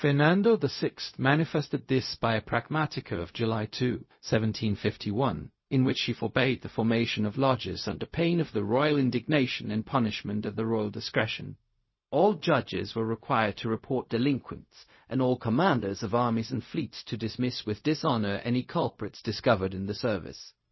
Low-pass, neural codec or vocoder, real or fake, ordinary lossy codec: 7.2 kHz; codec, 16 kHz, 0.4 kbps, LongCat-Audio-Codec; fake; MP3, 24 kbps